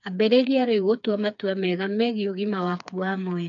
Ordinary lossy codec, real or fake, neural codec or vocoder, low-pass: none; fake; codec, 16 kHz, 4 kbps, FreqCodec, smaller model; 7.2 kHz